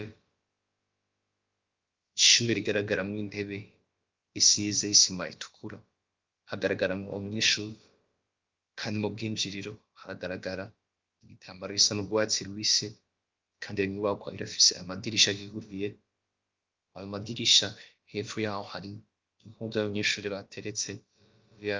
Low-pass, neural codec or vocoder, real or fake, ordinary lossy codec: 7.2 kHz; codec, 16 kHz, about 1 kbps, DyCAST, with the encoder's durations; fake; Opus, 32 kbps